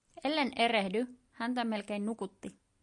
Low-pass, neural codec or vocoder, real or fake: 10.8 kHz; none; real